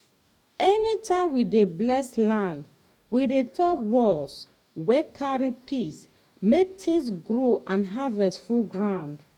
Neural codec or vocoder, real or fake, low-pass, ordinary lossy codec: codec, 44.1 kHz, 2.6 kbps, DAC; fake; 19.8 kHz; none